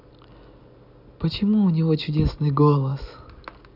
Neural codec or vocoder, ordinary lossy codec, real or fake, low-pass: none; none; real; 5.4 kHz